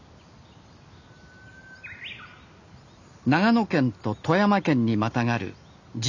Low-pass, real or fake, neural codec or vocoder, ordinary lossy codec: 7.2 kHz; real; none; none